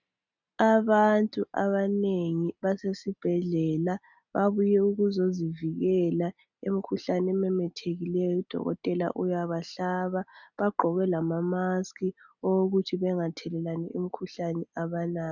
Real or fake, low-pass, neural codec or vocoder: real; 7.2 kHz; none